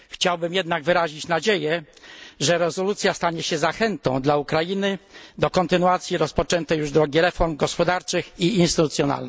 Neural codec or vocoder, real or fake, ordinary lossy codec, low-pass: none; real; none; none